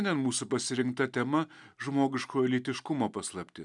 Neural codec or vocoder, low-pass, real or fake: none; 10.8 kHz; real